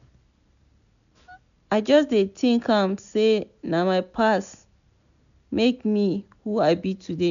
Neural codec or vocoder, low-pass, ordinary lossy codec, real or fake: none; 7.2 kHz; none; real